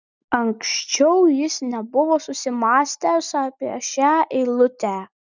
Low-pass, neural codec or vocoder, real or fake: 7.2 kHz; none; real